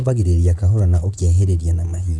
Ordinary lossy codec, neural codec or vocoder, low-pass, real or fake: none; vocoder, 22.05 kHz, 80 mel bands, Vocos; none; fake